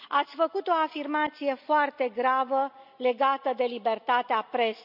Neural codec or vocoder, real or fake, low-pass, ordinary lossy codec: none; real; 5.4 kHz; none